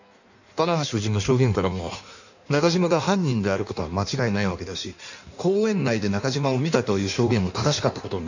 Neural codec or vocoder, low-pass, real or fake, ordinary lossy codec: codec, 16 kHz in and 24 kHz out, 1.1 kbps, FireRedTTS-2 codec; 7.2 kHz; fake; none